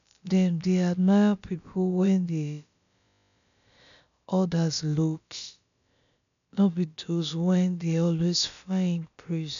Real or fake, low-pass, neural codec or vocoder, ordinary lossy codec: fake; 7.2 kHz; codec, 16 kHz, about 1 kbps, DyCAST, with the encoder's durations; none